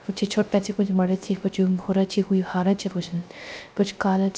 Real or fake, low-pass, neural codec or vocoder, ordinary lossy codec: fake; none; codec, 16 kHz, 0.3 kbps, FocalCodec; none